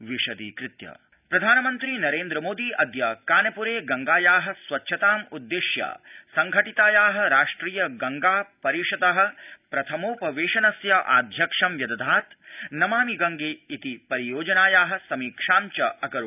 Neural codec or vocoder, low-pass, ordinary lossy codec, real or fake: none; 3.6 kHz; none; real